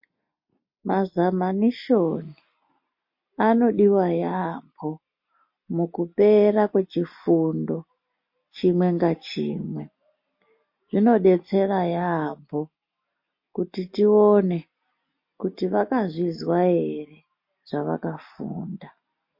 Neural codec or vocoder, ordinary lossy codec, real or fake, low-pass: none; MP3, 32 kbps; real; 5.4 kHz